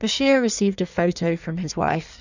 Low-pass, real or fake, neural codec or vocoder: 7.2 kHz; fake; codec, 16 kHz in and 24 kHz out, 1.1 kbps, FireRedTTS-2 codec